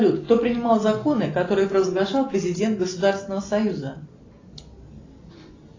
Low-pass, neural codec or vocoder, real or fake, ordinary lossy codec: 7.2 kHz; none; real; AAC, 32 kbps